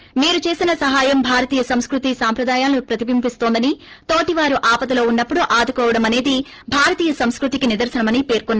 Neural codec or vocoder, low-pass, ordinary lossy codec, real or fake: none; 7.2 kHz; Opus, 16 kbps; real